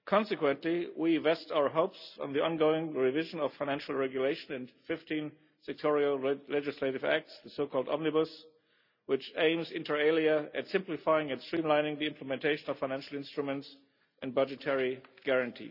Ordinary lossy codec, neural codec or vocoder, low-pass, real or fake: none; none; 5.4 kHz; real